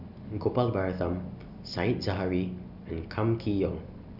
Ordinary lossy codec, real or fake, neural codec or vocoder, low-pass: none; real; none; 5.4 kHz